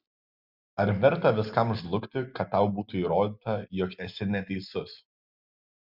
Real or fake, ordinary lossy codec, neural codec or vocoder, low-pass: real; Opus, 64 kbps; none; 5.4 kHz